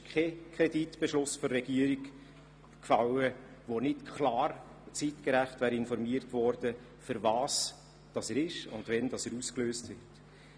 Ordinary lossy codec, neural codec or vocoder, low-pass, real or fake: none; none; none; real